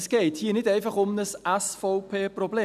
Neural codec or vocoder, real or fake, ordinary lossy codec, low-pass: none; real; none; 14.4 kHz